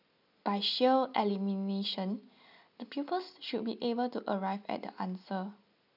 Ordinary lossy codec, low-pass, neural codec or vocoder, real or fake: none; 5.4 kHz; none; real